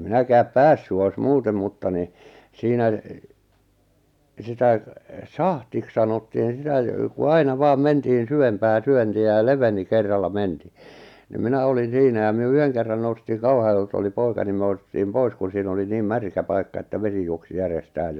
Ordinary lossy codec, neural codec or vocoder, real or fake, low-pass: none; none; real; 19.8 kHz